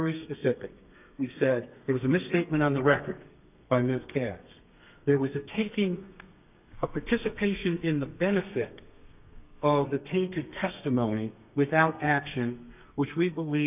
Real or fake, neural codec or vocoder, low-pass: fake; codec, 32 kHz, 1.9 kbps, SNAC; 3.6 kHz